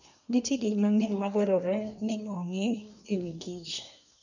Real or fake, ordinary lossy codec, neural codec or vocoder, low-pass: fake; none; codec, 24 kHz, 1 kbps, SNAC; 7.2 kHz